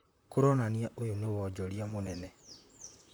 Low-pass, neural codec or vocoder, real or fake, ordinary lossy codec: none; vocoder, 44.1 kHz, 128 mel bands, Pupu-Vocoder; fake; none